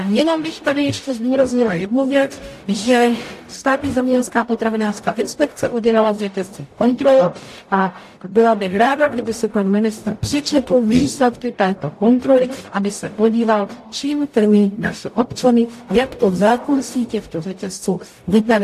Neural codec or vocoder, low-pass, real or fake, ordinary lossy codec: codec, 44.1 kHz, 0.9 kbps, DAC; 14.4 kHz; fake; AAC, 64 kbps